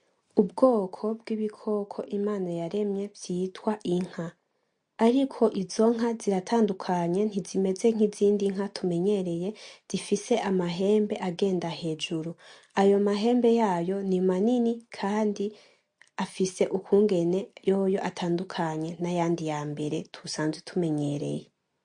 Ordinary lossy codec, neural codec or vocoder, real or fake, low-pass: MP3, 48 kbps; none; real; 9.9 kHz